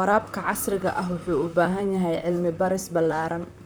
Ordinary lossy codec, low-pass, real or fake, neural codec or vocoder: none; none; fake; vocoder, 44.1 kHz, 128 mel bands, Pupu-Vocoder